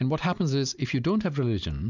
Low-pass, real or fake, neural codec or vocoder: 7.2 kHz; real; none